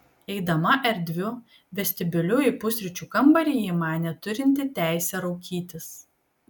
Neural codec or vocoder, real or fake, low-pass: none; real; 19.8 kHz